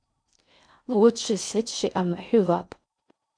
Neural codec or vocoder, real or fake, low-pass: codec, 16 kHz in and 24 kHz out, 0.6 kbps, FocalCodec, streaming, 4096 codes; fake; 9.9 kHz